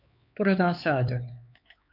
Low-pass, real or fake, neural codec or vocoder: 5.4 kHz; fake; codec, 16 kHz, 4 kbps, X-Codec, HuBERT features, trained on LibriSpeech